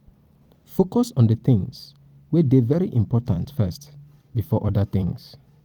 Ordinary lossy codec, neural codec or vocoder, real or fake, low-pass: Opus, 24 kbps; vocoder, 44.1 kHz, 128 mel bands every 256 samples, BigVGAN v2; fake; 19.8 kHz